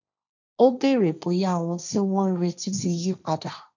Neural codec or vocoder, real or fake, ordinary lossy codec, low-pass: codec, 16 kHz, 1.1 kbps, Voila-Tokenizer; fake; none; 7.2 kHz